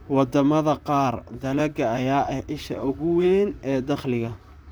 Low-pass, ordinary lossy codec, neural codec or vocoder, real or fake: none; none; vocoder, 44.1 kHz, 128 mel bands, Pupu-Vocoder; fake